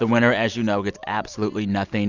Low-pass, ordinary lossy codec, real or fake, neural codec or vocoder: 7.2 kHz; Opus, 64 kbps; real; none